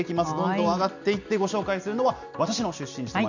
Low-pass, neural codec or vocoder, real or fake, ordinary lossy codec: 7.2 kHz; none; real; none